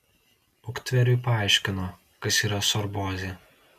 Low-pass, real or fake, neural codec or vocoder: 14.4 kHz; real; none